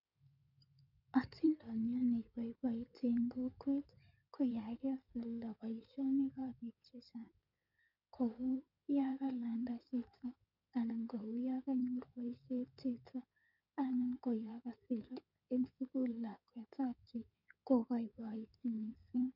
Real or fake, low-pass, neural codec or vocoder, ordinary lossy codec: fake; 5.4 kHz; codec, 16 kHz in and 24 kHz out, 2.2 kbps, FireRedTTS-2 codec; none